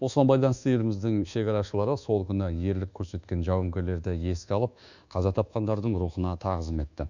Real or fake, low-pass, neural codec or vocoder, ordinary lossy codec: fake; 7.2 kHz; codec, 24 kHz, 1.2 kbps, DualCodec; none